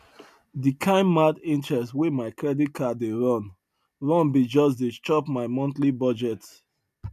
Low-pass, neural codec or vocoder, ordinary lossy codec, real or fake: 14.4 kHz; none; MP3, 96 kbps; real